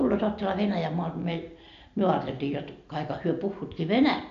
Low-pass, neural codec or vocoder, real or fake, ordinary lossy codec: 7.2 kHz; none; real; AAC, 64 kbps